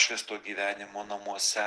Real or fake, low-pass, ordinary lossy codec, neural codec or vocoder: real; 10.8 kHz; Opus, 16 kbps; none